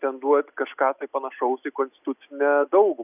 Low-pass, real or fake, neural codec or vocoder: 3.6 kHz; real; none